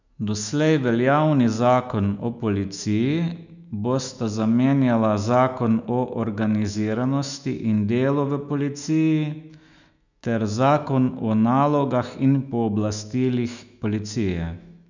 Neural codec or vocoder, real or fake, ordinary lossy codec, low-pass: autoencoder, 48 kHz, 128 numbers a frame, DAC-VAE, trained on Japanese speech; fake; none; 7.2 kHz